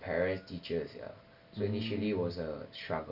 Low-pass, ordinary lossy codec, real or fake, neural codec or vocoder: 5.4 kHz; none; real; none